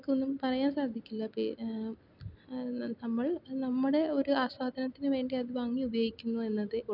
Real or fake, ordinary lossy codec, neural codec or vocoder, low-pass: real; none; none; 5.4 kHz